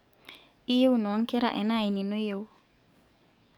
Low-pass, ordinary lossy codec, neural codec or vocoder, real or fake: 19.8 kHz; none; codec, 44.1 kHz, 7.8 kbps, DAC; fake